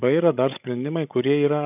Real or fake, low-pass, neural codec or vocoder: fake; 3.6 kHz; codec, 16 kHz, 16 kbps, FreqCodec, larger model